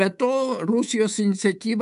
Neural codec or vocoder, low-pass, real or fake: codec, 24 kHz, 3.1 kbps, DualCodec; 10.8 kHz; fake